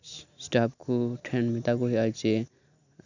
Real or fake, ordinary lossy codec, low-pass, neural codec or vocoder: real; none; 7.2 kHz; none